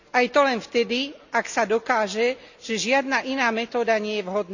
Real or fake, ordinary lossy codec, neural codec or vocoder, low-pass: real; none; none; 7.2 kHz